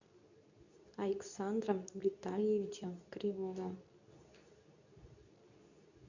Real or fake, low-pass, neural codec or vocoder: fake; 7.2 kHz; codec, 24 kHz, 0.9 kbps, WavTokenizer, medium speech release version 2